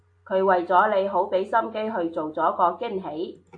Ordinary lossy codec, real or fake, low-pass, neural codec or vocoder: AAC, 48 kbps; real; 9.9 kHz; none